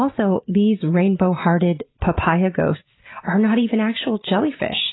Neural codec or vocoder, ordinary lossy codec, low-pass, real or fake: none; AAC, 16 kbps; 7.2 kHz; real